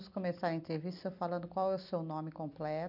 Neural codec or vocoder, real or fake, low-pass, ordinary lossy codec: none; real; 5.4 kHz; none